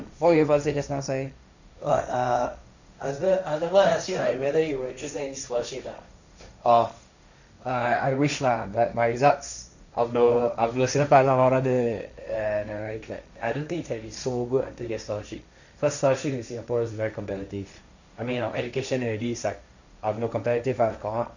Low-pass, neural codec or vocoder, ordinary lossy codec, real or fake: 7.2 kHz; codec, 16 kHz, 1.1 kbps, Voila-Tokenizer; none; fake